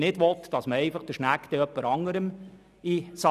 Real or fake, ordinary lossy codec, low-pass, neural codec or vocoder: real; none; 14.4 kHz; none